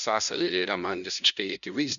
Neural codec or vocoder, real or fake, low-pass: codec, 16 kHz, 0.5 kbps, FunCodec, trained on LibriTTS, 25 frames a second; fake; 7.2 kHz